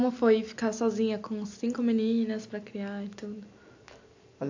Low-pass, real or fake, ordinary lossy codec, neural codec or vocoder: 7.2 kHz; real; AAC, 48 kbps; none